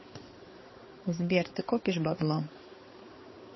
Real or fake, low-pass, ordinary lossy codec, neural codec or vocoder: fake; 7.2 kHz; MP3, 24 kbps; codec, 16 kHz, 4 kbps, X-Codec, HuBERT features, trained on balanced general audio